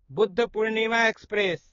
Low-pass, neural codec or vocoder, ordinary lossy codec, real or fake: 7.2 kHz; codec, 16 kHz, 4 kbps, X-Codec, HuBERT features, trained on balanced general audio; AAC, 24 kbps; fake